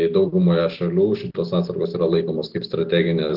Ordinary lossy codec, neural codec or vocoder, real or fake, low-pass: Opus, 16 kbps; none; real; 5.4 kHz